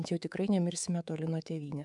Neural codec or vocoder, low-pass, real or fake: codec, 24 kHz, 3.1 kbps, DualCodec; 10.8 kHz; fake